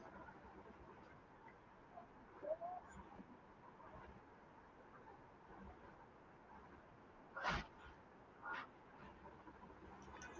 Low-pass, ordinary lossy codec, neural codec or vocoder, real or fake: 7.2 kHz; Opus, 32 kbps; none; real